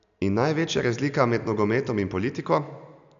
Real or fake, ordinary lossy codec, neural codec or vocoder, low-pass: real; none; none; 7.2 kHz